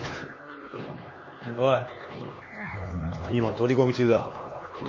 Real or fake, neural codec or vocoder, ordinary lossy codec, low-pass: fake; codec, 16 kHz, 2 kbps, X-Codec, HuBERT features, trained on LibriSpeech; MP3, 32 kbps; 7.2 kHz